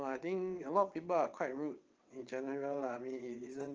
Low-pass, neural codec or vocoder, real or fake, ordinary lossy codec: 7.2 kHz; vocoder, 22.05 kHz, 80 mel bands, WaveNeXt; fake; Opus, 24 kbps